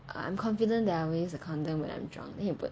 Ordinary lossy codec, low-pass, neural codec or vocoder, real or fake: none; none; none; real